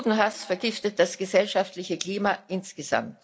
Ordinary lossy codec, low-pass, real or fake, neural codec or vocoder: none; none; real; none